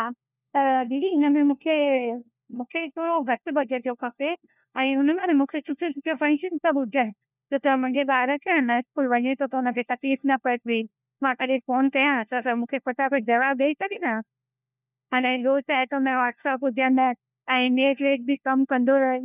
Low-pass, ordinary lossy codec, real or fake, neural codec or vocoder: 3.6 kHz; none; fake; codec, 16 kHz, 1 kbps, FunCodec, trained on LibriTTS, 50 frames a second